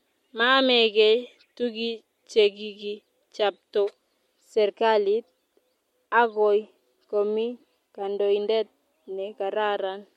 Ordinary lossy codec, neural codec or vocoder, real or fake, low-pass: MP3, 64 kbps; none; real; 19.8 kHz